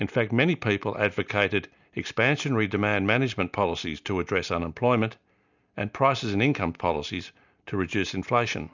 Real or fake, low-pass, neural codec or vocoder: real; 7.2 kHz; none